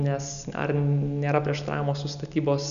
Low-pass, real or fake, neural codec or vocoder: 7.2 kHz; real; none